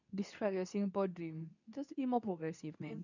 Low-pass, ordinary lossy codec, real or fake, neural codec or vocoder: 7.2 kHz; none; fake; codec, 24 kHz, 0.9 kbps, WavTokenizer, medium speech release version 2